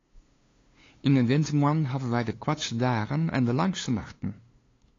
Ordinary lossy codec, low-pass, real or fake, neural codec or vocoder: AAC, 32 kbps; 7.2 kHz; fake; codec, 16 kHz, 2 kbps, FunCodec, trained on LibriTTS, 25 frames a second